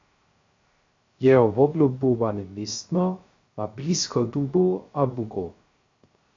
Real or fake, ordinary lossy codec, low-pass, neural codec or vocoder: fake; AAC, 48 kbps; 7.2 kHz; codec, 16 kHz, 0.3 kbps, FocalCodec